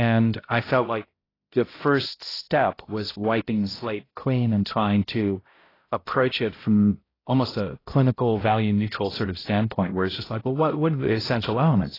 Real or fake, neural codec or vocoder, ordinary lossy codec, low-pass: fake; codec, 16 kHz, 0.5 kbps, X-Codec, HuBERT features, trained on balanced general audio; AAC, 24 kbps; 5.4 kHz